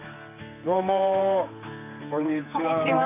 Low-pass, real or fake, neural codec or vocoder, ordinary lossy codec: 3.6 kHz; fake; codec, 16 kHz, 4 kbps, X-Codec, HuBERT features, trained on general audio; none